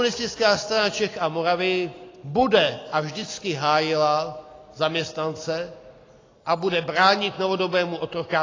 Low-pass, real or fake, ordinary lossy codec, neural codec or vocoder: 7.2 kHz; real; AAC, 32 kbps; none